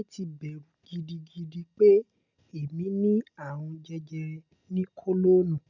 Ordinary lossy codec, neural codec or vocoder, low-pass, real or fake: none; none; 7.2 kHz; real